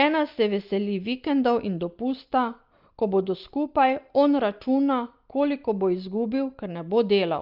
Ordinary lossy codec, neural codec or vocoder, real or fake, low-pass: Opus, 24 kbps; none; real; 5.4 kHz